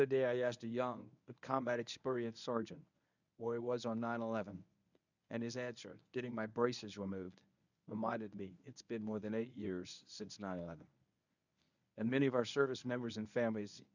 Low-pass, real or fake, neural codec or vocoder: 7.2 kHz; fake; codec, 24 kHz, 0.9 kbps, WavTokenizer, medium speech release version 1